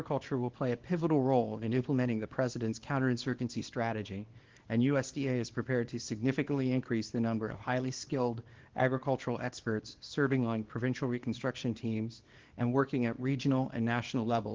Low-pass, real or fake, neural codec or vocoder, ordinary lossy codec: 7.2 kHz; fake; codec, 24 kHz, 0.9 kbps, WavTokenizer, small release; Opus, 16 kbps